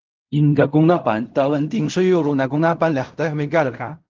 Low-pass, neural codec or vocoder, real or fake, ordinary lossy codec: 7.2 kHz; codec, 16 kHz in and 24 kHz out, 0.4 kbps, LongCat-Audio-Codec, fine tuned four codebook decoder; fake; Opus, 32 kbps